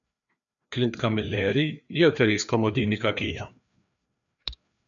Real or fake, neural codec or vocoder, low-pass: fake; codec, 16 kHz, 2 kbps, FreqCodec, larger model; 7.2 kHz